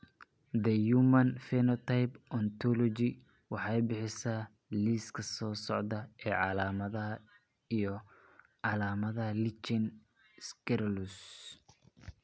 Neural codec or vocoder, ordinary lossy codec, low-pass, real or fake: none; none; none; real